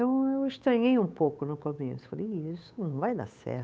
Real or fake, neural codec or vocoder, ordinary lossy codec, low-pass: fake; codec, 16 kHz, 8 kbps, FunCodec, trained on Chinese and English, 25 frames a second; none; none